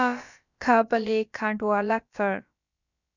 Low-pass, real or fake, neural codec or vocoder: 7.2 kHz; fake; codec, 16 kHz, about 1 kbps, DyCAST, with the encoder's durations